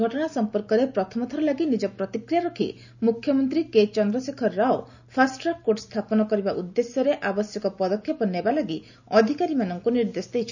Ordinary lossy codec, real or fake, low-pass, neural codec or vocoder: none; real; 7.2 kHz; none